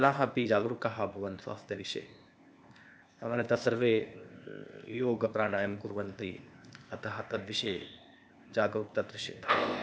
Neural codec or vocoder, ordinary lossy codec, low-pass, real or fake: codec, 16 kHz, 0.8 kbps, ZipCodec; none; none; fake